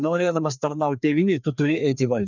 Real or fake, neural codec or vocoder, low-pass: fake; codec, 16 kHz, 2 kbps, FreqCodec, larger model; 7.2 kHz